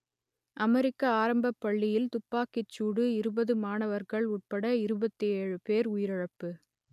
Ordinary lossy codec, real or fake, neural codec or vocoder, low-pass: none; real; none; 14.4 kHz